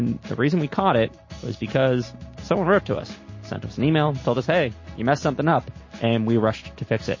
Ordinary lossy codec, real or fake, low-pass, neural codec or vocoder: MP3, 32 kbps; real; 7.2 kHz; none